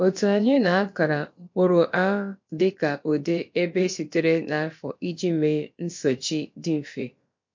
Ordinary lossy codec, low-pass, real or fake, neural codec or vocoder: MP3, 48 kbps; 7.2 kHz; fake; codec, 16 kHz, about 1 kbps, DyCAST, with the encoder's durations